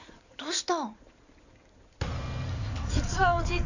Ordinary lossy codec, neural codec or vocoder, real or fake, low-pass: none; codec, 16 kHz in and 24 kHz out, 2.2 kbps, FireRedTTS-2 codec; fake; 7.2 kHz